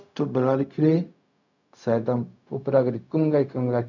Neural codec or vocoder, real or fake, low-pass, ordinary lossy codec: codec, 16 kHz, 0.4 kbps, LongCat-Audio-Codec; fake; 7.2 kHz; none